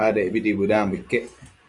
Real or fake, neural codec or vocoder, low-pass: fake; vocoder, 24 kHz, 100 mel bands, Vocos; 10.8 kHz